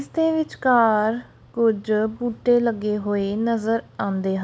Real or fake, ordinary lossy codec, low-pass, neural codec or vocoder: real; none; none; none